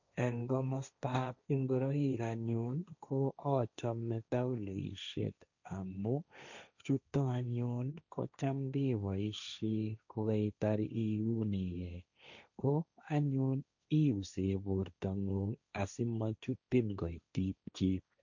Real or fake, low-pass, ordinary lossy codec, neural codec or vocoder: fake; 7.2 kHz; none; codec, 16 kHz, 1.1 kbps, Voila-Tokenizer